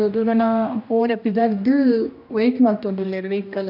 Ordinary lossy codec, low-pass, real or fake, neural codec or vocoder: none; 5.4 kHz; fake; codec, 16 kHz, 1 kbps, X-Codec, HuBERT features, trained on general audio